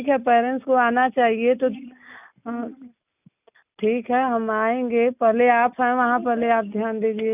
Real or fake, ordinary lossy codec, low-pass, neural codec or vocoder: real; none; 3.6 kHz; none